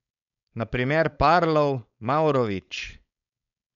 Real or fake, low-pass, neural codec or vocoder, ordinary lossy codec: fake; 7.2 kHz; codec, 16 kHz, 4.8 kbps, FACodec; none